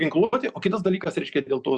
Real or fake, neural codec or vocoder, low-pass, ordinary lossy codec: real; none; 10.8 kHz; Opus, 64 kbps